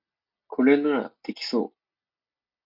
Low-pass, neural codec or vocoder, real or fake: 5.4 kHz; none; real